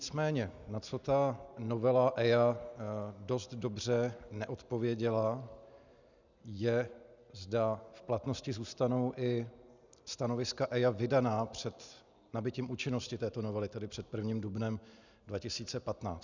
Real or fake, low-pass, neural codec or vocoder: real; 7.2 kHz; none